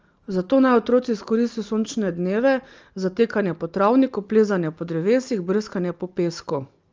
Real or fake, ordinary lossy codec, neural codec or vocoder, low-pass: real; Opus, 24 kbps; none; 7.2 kHz